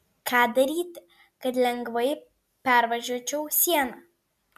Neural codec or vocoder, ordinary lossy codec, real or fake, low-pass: none; MP3, 96 kbps; real; 14.4 kHz